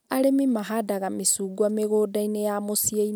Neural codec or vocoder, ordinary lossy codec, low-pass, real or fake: none; none; none; real